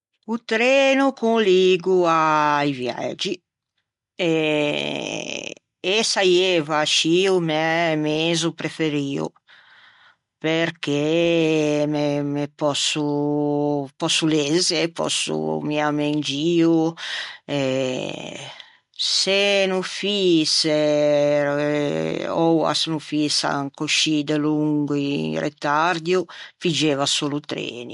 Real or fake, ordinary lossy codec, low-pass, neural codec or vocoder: real; MP3, 64 kbps; 10.8 kHz; none